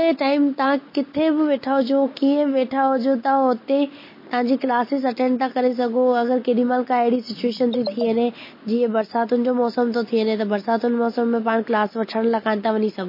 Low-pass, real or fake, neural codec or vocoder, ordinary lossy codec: 5.4 kHz; real; none; MP3, 24 kbps